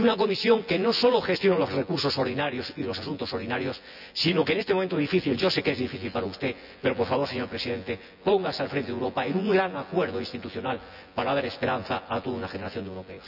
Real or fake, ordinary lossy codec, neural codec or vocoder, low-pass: fake; none; vocoder, 24 kHz, 100 mel bands, Vocos; 5.4 kHz